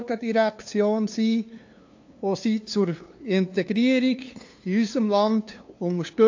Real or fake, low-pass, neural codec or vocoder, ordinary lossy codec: fake; 7.2 kHz; codec, 16 kHz, 2 kbps, FunCodec, trained on LibriTTS, 25 frames a second; AAC, 48 kbps